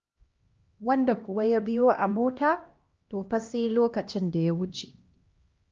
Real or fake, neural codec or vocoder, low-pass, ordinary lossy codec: fake; codec, 16 kHz, 1 kbps, X-Codec, HuBERT features, trained on LibriSpeech; 7.2 kHz; Opus, 24 kbps